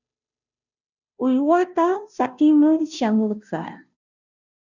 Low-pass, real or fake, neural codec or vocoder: 7.2 kHz; fake; codec, 16 kHz, 0.5 kbps, FunCodec, trained on Chinese and English, 25 frames a second